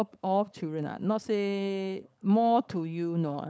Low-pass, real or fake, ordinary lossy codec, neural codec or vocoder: none; fake; none; codec, 16 kHz, 4.8 kbps, FACodec